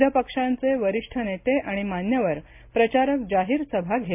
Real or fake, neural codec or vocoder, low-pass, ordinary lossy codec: real; none; 3.6 kHz; MP3, 32 kbps